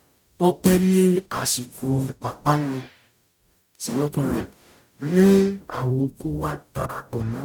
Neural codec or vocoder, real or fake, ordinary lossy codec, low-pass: codec, 44.1 kHz, 0.9 kbps, DAC; fake; none; 19.8 kHz